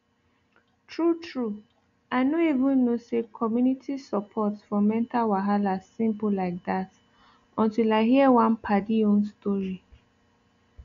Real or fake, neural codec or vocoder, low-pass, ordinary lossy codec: real; none; 7.2 kHz; none